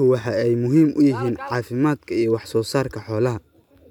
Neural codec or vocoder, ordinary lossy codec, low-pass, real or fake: none; none; 19.8 kHz; real